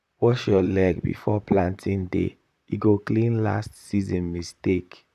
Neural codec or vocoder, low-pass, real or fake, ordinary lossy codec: vocoder, 44.1 kHz, 128 mel bands, Pupu-Vocoder; 14.4 kHz; fake; none